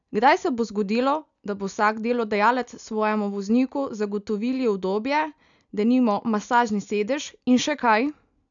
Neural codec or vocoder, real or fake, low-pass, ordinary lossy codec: none; real; 7.2 kHz; none